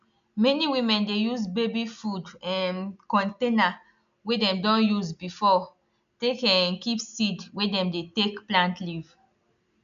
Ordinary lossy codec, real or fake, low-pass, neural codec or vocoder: none; real; 7.2 kHz; none